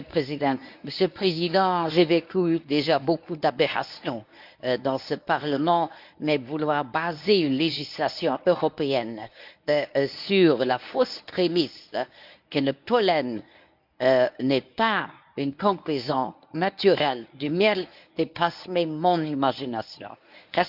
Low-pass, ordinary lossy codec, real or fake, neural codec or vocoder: 5.4 kHz; none; fake; codec, 24 kHz, 0.9 kbps, WavTokenizer, medium speech release version 1